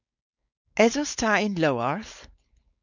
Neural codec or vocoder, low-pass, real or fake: codec, 16 kHz, 4.8 kbps, FACodec; 7.2 kHz; fake